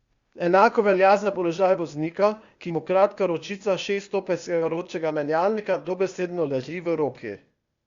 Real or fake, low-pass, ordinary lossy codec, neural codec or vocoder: fake; 7.2 kHz; Opus, 64 kbps; codec, 16 kHz, 0.8 kbps, ZipCodec